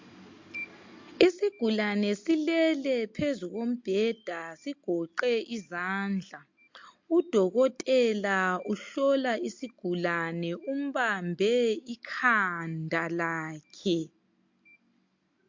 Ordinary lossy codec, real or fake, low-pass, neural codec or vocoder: MP3, 48 kbps; real; 7.2 kHz; none